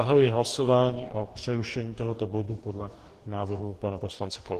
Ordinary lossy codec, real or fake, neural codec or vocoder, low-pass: Opus, 16 kbps; fake; codec, 44.1 kHz, 2.6 kbps, DAC; 14.4 kHz